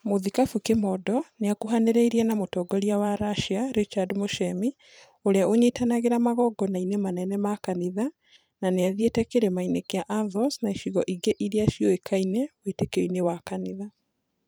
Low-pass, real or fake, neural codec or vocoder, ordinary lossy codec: none; fake; vocoder, 44.1 kHz, 128 mel bands every 512 samples, BigVGAN v2; none